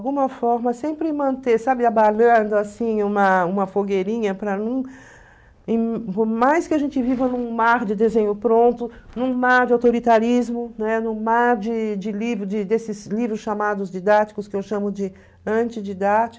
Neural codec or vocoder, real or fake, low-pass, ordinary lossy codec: none; real; none; none